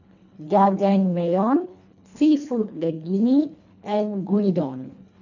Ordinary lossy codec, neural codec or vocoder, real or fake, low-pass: none; codec, 24 kHz, 1.5 kbps, HILCodec; fake; 7.2 kHz